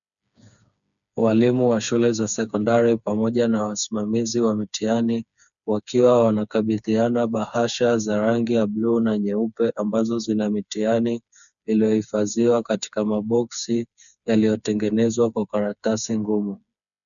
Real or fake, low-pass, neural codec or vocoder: fake; 7.2 kHz; codec, 16 kHz, 4 kbps, FreqCodec, smaller model